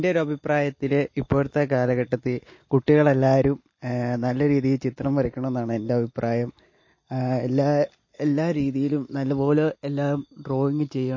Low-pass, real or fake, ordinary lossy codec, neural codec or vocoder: 7.2 kHz; real; MP3, 32 kbps; none